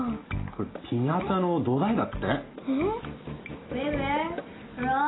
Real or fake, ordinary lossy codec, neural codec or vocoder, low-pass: real; AAC, 16 kbps; none; 7.2 kHz